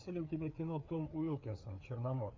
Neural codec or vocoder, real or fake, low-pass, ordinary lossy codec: codec, 16 kHz, 4 kbps, FreqCodec, larger model; fake; 7.2 kHz; MP3, 64 kbps